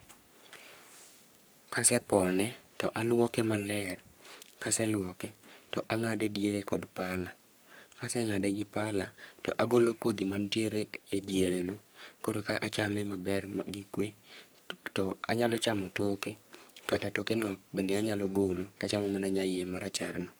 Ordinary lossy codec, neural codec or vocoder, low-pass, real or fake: none; codec, 44.1 kHz, 3.4 kbps, Pupu-Codec; none; fake